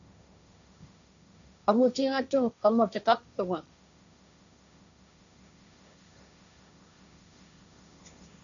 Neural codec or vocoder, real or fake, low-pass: codec, 16 kHz, 1.1 kbps, Voila-Tokenizer; fake; 7.2 kHz